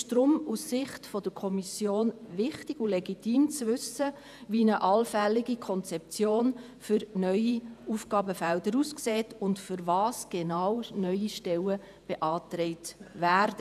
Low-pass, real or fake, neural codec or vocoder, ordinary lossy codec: 14.4 kHz; fake; vocoder, 48 kHz, 128 mel bands, Vocos; none